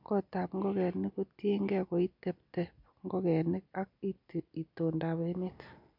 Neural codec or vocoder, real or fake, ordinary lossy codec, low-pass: none; real; none; 5.4 kHz